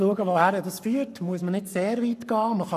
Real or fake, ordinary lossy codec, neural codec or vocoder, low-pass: fake; none; codec, 44.1 kHz, 7.8 kbps, Pupu-Codec; 14.4 kHz